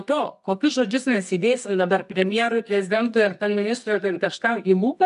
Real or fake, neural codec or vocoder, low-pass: fake; codec, 24 kHz, 0.9 kbps, WavTokenizer, medium music audio release; 10.8 kHz